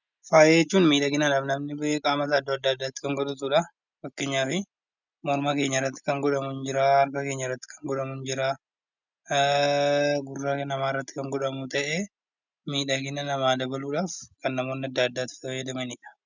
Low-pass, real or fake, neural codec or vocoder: 7.2 kHz; real; none